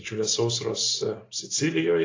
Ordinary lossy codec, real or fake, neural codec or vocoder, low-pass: AAC, 32 kbps; real; none; 7.2 kHz